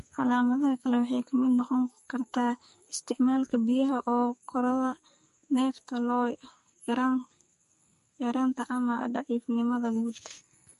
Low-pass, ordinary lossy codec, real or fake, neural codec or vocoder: 14.4 kHz; MP3, 48 kbps; fake; codec, 44.1 kHz, 2.6 kbps, SNAC